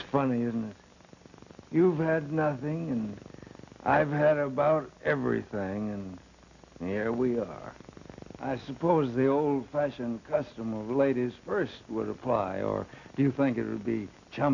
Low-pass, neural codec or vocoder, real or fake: 7.2 kHz; none; real